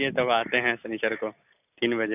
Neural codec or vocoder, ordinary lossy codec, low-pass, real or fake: none; none; 3.6 kHz; real